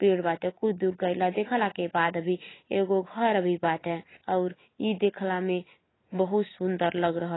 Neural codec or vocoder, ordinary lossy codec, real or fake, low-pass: none; AAC, 16 kbps; real; 7.2 kHz